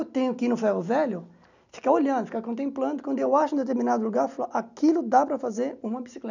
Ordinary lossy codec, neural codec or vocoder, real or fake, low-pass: none; none; real; 7.2 kHz